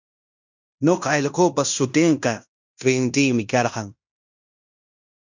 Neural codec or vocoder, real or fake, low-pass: codec, 16 kHz in and 24 kHz out, 0.9 kbps, LongCat-Audio-Codec, fine tuned four codebook decoder; fake; 7.2 kHz